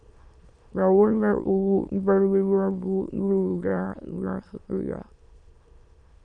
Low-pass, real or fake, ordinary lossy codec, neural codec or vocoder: 9.9 kHz; fake; Opus, 64 kbps; autoencoder, 22.05 kHz, a latent of 192 numbers a frame, VITS, trained on many speakers